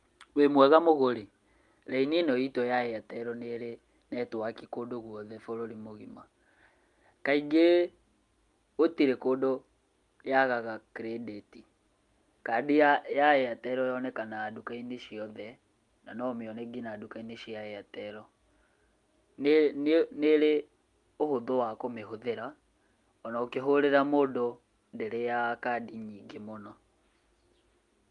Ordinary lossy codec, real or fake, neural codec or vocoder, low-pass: Opus, 32 kbps; real; none; 10.8 kHz